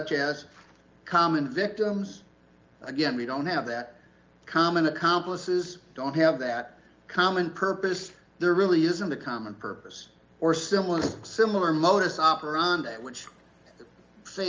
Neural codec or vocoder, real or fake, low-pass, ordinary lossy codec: none; real; 7.2 kHz; Opus, 32 kbps